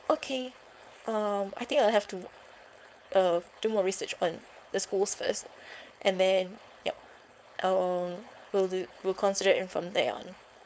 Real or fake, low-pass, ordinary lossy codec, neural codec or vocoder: fake; none; none; codec, 16 kHz, 4.8 kbps, FACodec